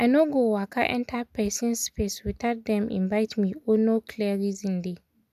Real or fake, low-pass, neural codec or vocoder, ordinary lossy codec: real; 14.4 kHz; none; none